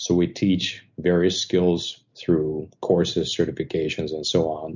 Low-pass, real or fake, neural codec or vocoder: 7.2 kHz; real; none